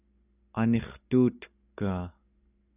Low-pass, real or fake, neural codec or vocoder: 3.6 kHz; real; none